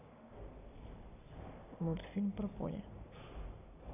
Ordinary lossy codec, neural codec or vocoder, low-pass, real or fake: none; none; 3.6 kHz; real